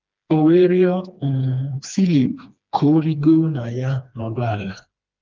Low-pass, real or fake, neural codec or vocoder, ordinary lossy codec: 7.2 kHz; fake; codec, 16 kHz, 2 kbps, FreqCodec, smaller model; Opus, 24 kbps